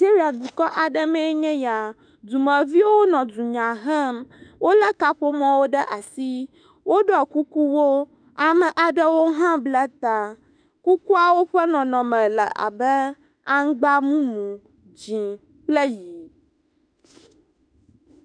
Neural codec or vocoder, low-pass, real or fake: autoencoder, 48 kHz, 32 numbers a frame, DAC-VAE, trained on Japanese speech; 9.9 kHz; fake